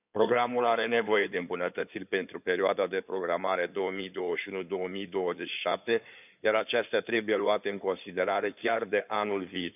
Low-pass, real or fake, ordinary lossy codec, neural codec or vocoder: 3.6 kHz; fake; none; codec, 16 kHz in and 24 kHz out, 2.2 kbps, FireRedTTS-2 codec